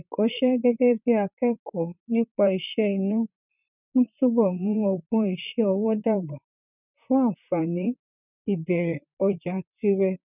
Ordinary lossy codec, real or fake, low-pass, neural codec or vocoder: none; fake; 3.6 kHz; vocoder, 44.1 kHz, 128 mel bands, Pupu-Vocoder